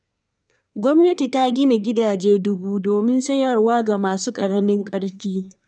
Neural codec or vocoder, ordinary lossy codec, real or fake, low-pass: codec, 24 kHz, 1 kbps, SNAC; none; fake; 9.9 kHz